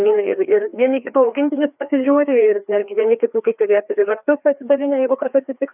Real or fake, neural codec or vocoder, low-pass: fake; codec, 16 kHz, 2 kbps, FreqCodec, larger model; 3.6 kHz